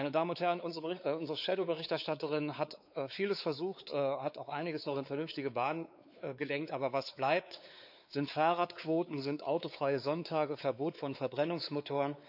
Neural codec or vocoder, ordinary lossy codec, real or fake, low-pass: codec, 16 kHz, 4 kbps, X-Codec, WavLM features, trained on Multilingual LibriSpeech; none; fake; 5.4 kHz